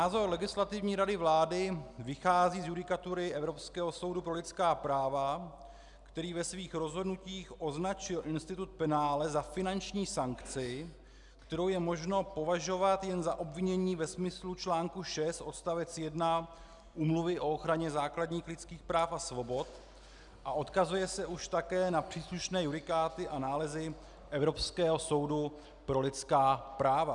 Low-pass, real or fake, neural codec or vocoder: 10.8 kHz; real; none